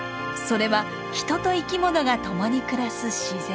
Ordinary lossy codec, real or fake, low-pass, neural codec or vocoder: none; real; none; none